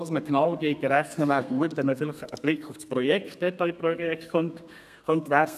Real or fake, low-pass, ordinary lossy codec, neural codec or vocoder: fake; 14.4 kHz; none; codec, 32 kHz, 1.9 kbps, SNAC